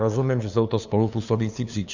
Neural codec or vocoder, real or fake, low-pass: codec, 16 kHz, 2 kbps, FunCodec, trained on LibriTTS, 25 frames a second; fake; 7.2 kHz